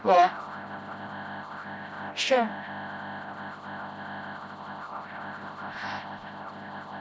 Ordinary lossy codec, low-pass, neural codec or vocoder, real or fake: none; none; codec, 16 kHz, 0.5 kbps, FreqCodec, smaller model; fake